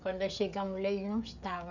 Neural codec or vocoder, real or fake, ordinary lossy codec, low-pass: codec, 16 kHz, 16 kbps, FreqCodec, smaller model; fake; none; 7.2 kHz